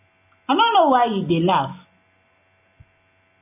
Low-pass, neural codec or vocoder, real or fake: 3.6 kHz; none; real